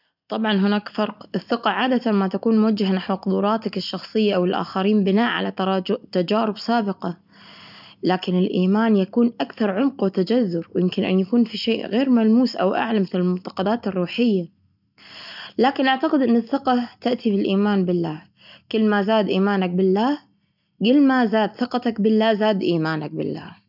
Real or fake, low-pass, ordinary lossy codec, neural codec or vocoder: real; 5.4 kHz; none; none